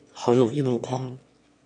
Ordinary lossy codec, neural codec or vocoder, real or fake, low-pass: MP3, 64 kbps; autoencoder, 22.05 kHz, a latent of 192 numbers a frame, VITS, trained on one speaker; fake; 9.9 kHz